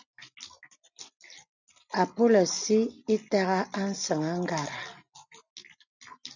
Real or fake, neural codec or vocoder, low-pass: real; none; 7.2 kHz